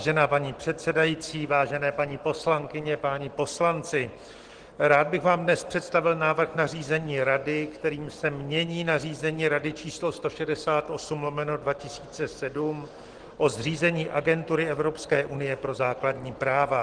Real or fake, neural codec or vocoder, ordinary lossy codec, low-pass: real; none; Opus, 16 kbps; 9.9 kHz